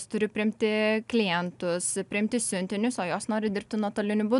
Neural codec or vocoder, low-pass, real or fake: none; 10.8 kHz; real